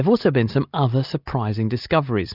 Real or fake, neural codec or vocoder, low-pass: real; none; 5.4 kHz